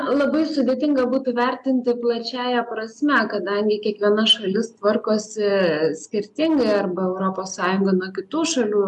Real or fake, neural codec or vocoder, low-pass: real; none; 10.8 kHz